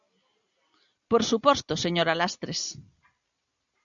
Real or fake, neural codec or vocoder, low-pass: real; none; 7.2 kHz